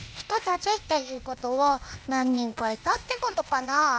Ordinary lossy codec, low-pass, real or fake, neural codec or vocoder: none; none; fake; codec, 16 kHz, 0.8 kbps, ZipCodec